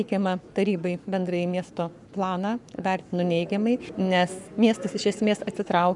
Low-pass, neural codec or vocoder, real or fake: 10.8 kHz; codec, 44.1 kHz, 7.8 kbps, Pupu-Codec; fake